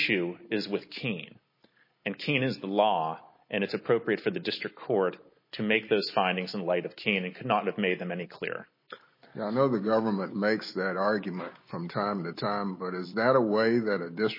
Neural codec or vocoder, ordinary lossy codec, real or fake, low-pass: none; MP3, 24 kbps; real; 5.4 kHz